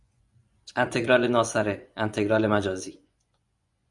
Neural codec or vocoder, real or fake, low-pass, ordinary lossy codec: vocoder, 24 kHz, 100 mel bands, Vocos; fake; 10.8 kHz; Opus, 64 kbps